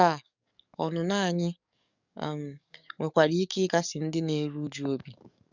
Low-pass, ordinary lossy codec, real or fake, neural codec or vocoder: 7.2 kHz; none; fake; codec, 44.1 kHz, 7.8 kbps, DAC